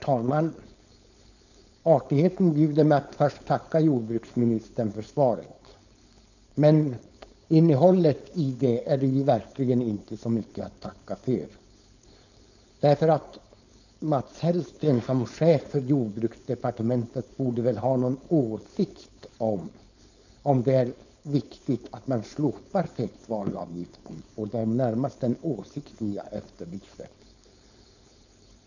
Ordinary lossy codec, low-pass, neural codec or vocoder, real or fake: none; 7.2 kHz; codec, 16 kHz, 4.8 kbps, FACodec; fake